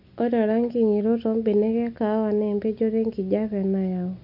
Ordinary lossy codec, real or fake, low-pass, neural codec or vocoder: none; real; 5.4 kHz; none